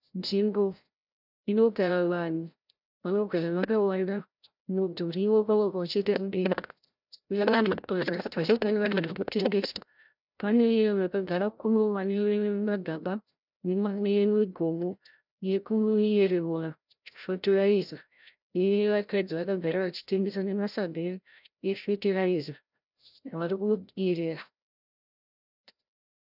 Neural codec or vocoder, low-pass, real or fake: codec, 16 kHz, 0.5 kbps, FreqCodec, larger model; 5.4 kHz; fake